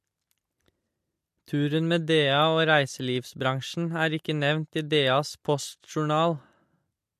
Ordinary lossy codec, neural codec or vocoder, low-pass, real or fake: MP3, 64 kbps; none; 14.4 kHz; real